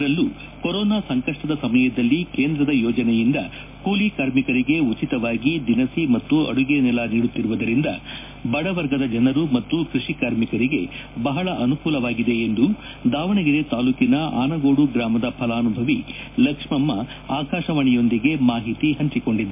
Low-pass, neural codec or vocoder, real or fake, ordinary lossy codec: 3.6 kHz; none; real; MP3, 24 kbps